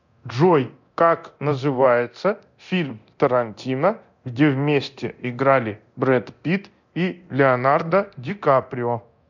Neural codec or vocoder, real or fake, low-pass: codec, 24 kHz, 0.9 kbps, DualCodec; fake; 7.2 kHz